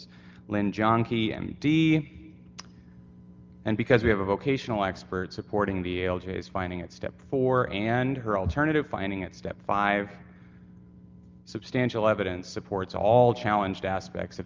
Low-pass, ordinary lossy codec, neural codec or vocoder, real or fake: 7.2 kHz; Opus, 24 kbps; none; real